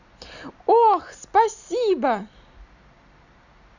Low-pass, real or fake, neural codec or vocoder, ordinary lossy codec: 7.2 kHz; real; none; none